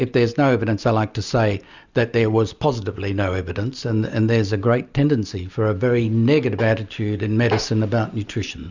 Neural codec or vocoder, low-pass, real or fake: none; 7.2 kHz; real